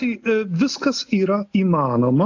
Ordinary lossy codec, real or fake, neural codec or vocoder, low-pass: AAC, 48 kbps; real; none; 7.2 kHz